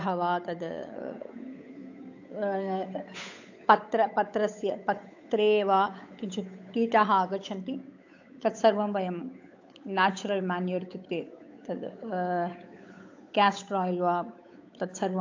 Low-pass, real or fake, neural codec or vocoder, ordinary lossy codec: 7.2 kHz; fake; codec, 16 kHz, 8 kbps, FunCodec, trained on Chinese and English, 25 frames a second; AAC, 48 kbps